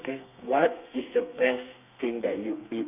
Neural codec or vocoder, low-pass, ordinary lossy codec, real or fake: codec, 32 kHz, 1.9 kbps, SNAC; 3.6 kHz; none; fake